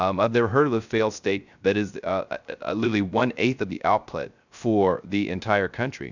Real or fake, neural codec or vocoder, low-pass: fake; codec, 16 kHz, 0.3 kbps, FocalCodec; 7.2 kHz